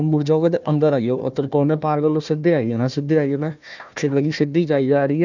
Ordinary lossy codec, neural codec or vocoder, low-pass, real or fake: none; codec, 16 kHz, 1 kbps, FunCodec, trained on Chinese and English, 50 frames a second; 7.2 kHz; fake